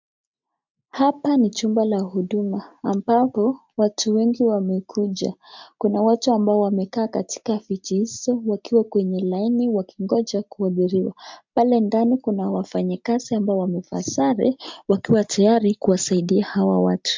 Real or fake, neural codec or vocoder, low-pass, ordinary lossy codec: real; none; 7.2 kHz; MP3, 64 kbps